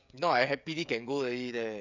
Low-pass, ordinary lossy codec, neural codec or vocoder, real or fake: 7.2 kHz; none; codec, 16 kHz, 16 kbps, FreqCodec, smaller model; fake